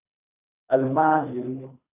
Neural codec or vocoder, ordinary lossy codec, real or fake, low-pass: codec, 24 kHz, 3 kbps, HILCodec; AAC, 32 kbps; fake; 3.6 kHz